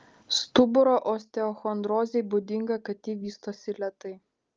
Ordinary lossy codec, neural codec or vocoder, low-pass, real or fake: Opus, 24 kbps; none; 7.2 kHz; real